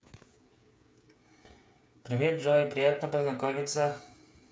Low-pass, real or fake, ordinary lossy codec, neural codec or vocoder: none; fake; none; codec, 16 kHz, 8 kbps, FreqCodec, smaller model